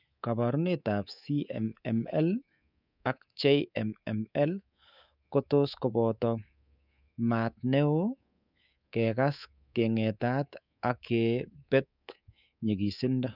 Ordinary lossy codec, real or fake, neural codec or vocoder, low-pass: none; fake; codec, 24 kHz, 3.1 kbps, DualCodec; 5.4 kHz